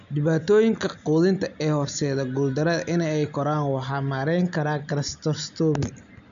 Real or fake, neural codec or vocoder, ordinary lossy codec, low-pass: real; none; AAC, 96 kbps; 7.2 kHz